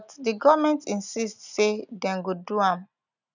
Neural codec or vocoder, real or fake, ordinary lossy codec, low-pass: none; real; none; 7.2 kHz